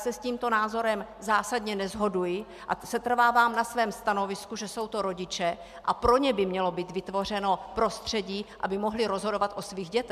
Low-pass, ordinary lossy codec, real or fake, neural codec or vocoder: 14.4 kHz; MP3, 96 kbps; real; none